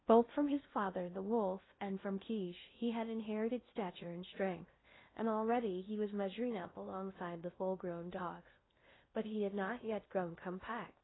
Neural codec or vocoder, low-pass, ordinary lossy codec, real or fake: codec, 16 kHz in and 24 kHz out, 0.6 kbps, FocalCodec, streaming, 2048 codes; 7.2 kHz; AAC, 16 kbps; fake